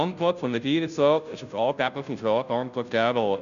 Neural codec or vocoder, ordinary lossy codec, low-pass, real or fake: codec, 16 kHz, 0.5 kbps, FunCodec, trained on Chinese and English, 25 frames a second; none; 7.2 kHz; fake